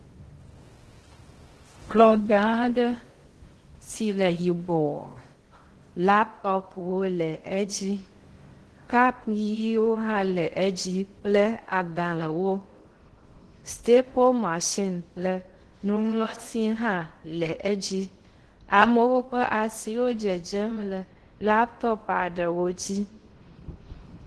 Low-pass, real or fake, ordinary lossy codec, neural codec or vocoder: 10.8 kHz; fake; Opus, 16 kbps; codec, 16 kHz in and 24 kHz out, 0.6 kbps, FocalCodec, streaming, 2048 codes